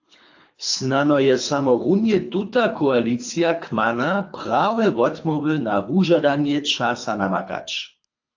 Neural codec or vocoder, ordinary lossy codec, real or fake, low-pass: codec, 24 kHz, 3 kbps, HILCodec; AAC, 48 kbps; fake; 7.2 kHz